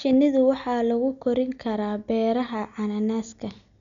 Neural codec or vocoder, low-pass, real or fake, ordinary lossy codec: none; 7.2 kHz; real; none